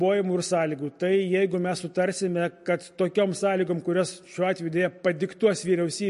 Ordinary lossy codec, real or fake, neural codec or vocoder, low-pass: MP3, 48 kbps; real; none; 14.4 kHz